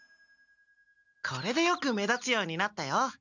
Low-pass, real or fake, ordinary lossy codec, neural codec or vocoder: 7.2 kHz; real; none; none